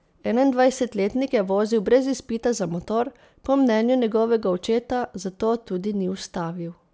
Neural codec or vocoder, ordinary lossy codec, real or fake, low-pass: none; none; real; none